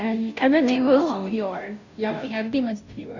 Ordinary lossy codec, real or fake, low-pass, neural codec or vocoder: none; fake; 7.2 kHz; codec, 16 kHz, 0.5 kbps, FunCodec, trained on Chinese and English, 25 frames a second